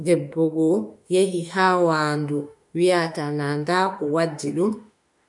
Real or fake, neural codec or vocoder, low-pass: fake; autoencoder, 48 kHz, 32 numbers a frame, DAC-VAE, trained on Japanese speech; 10.8 kHz